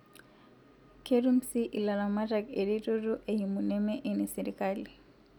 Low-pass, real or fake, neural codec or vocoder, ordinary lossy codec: 19.8 kHz; real; none; none